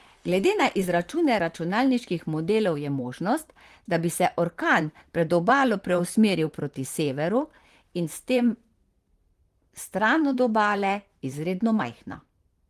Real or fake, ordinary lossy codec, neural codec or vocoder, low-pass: fake; Opus, 24 kbps; vocoder, 44.1 kHz, 128 mel bands, Pupu-Vocoder; 14.4 kHz